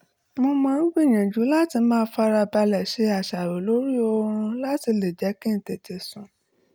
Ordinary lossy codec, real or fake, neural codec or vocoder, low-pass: none; real; none; none